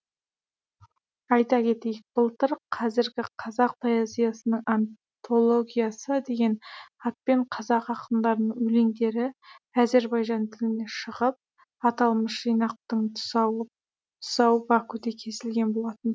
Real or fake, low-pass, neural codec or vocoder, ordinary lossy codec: real; none; none; none